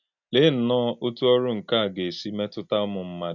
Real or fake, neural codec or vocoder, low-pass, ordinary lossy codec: real; none; 7.2 kHz; none